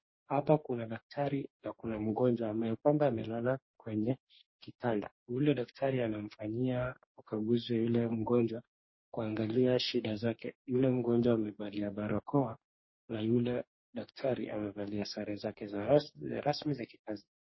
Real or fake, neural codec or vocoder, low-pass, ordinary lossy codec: fake; codec, 44.1 kHz, 2.6 kbps, DAC; 7.2 kHz; MP3, 24 kbps